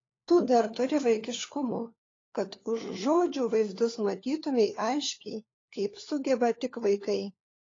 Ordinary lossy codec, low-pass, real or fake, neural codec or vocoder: AAC, 32 kbps; 7.2 kHz; fake; codec, 16 kHz, 4 kbps, FunCodec, trained on LibriTTS, 50 frames a second